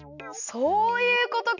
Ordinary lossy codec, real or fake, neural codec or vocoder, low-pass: none; real; none; 7.2 kHz